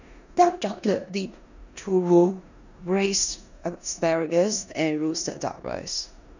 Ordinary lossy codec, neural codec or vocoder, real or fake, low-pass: none; codec, 16 kHz in and 24 kHz out, 0.9 kbps, LongCat-Audio-Codec, four codebook decoder; fake; 7.2 kHz